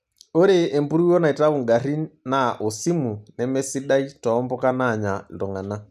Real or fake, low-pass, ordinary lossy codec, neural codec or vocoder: real; 14.4 kHz; none; none